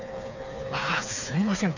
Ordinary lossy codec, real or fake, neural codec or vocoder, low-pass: none; fake; codec, 16 kHz, 4 kbps, FreqCodec, smaller model; 7.2 kHz